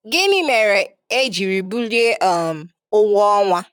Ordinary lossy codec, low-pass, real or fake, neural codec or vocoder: none; 19.8 kHz; fake; vocoder, 44.1 kHz, 128 mel bands, Pupu-Vocoder